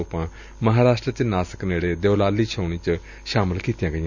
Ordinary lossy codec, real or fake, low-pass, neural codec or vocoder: none; real; 7.2 kHz; none